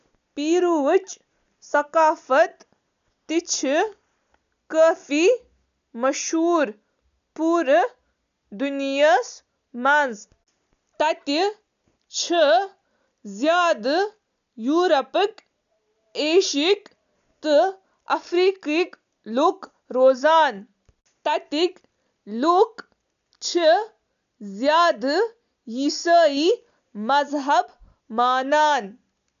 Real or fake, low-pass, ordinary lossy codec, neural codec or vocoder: real; 7.2 kHz; none; none